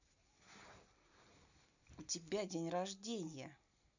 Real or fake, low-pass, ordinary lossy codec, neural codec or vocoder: real; 7.2 kHz; none; none